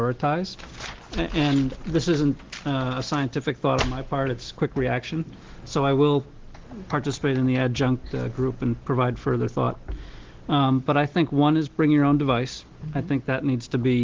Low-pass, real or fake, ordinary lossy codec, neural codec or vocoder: 7.2 kHz; real; Opus, 16 kbps; none